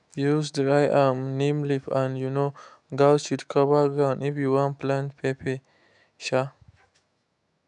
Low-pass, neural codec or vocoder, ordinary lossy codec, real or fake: 10.8 kHz; autoencoder, 48 kHz, 128 numbers a frame, DAC-VAE, trained on Japanese speech; none; fake